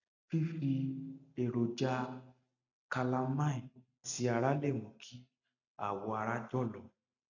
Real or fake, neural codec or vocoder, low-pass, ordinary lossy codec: real; none; 7.2 kHz; none